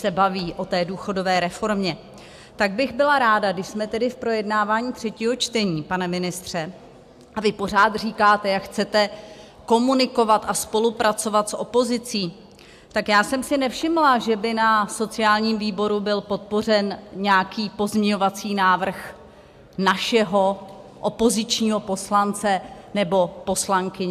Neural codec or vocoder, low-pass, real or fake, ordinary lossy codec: none; 14.4 kHz; real; AAC, 96 kbps